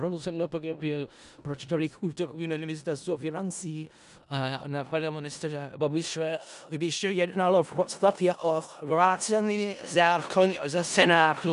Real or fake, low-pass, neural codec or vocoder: fake; 10.8 kHz; codec, 16 kHz in and 24 kHz out, 0.4 kbps, LongCat-Audio-Codec, four codebook decoder